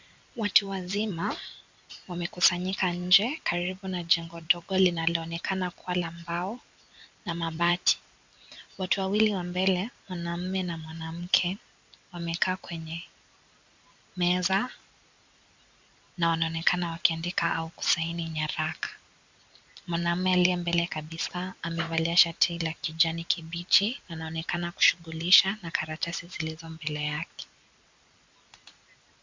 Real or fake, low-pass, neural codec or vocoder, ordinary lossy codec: fake; 7.2 kHz; vocoder, 44.1 kHz, 128 mel bands every 256 samples, BigVGAN v2; MP3, 64 kbps